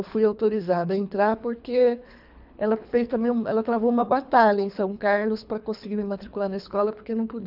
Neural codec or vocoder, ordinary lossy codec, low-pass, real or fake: codec, 24 kHz, 3 kbps, HILCodec; none; 5.4 kHz; fake